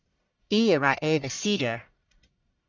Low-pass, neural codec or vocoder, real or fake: 7.2 kHz; codec, 44.1 kHz, 1.7 kbps, Pupu-Codec; fake